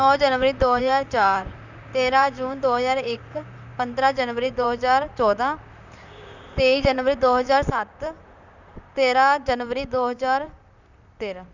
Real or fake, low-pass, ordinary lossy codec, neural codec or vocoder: fake; 7.2 kHz; none; vocoder, 44.1 kHz, 128 mel bands, Pupu-Vocoder